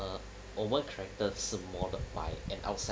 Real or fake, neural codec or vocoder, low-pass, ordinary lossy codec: real; none; none; none